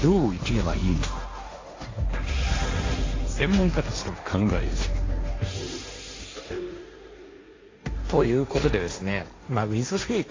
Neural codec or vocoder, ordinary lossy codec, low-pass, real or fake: codec, 16 kHz, 1.1 kbps, Voila-Tokenizer; AAC, 32 kbps; 7.2 kHz; fake